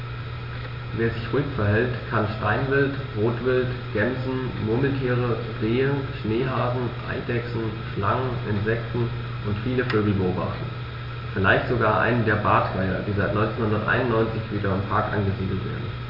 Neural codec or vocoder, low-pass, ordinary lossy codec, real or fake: none; 5.4 kHz; MP3, 32 kbps; real